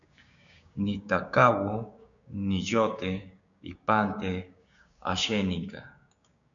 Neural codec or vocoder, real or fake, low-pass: codec, 16 kHz, 6 kbps, DAC; fake; 7.2 kHz